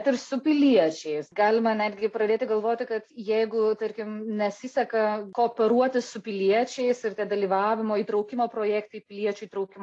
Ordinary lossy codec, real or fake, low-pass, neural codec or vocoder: AAC, 48 kbps; real; 10.8 kHz; none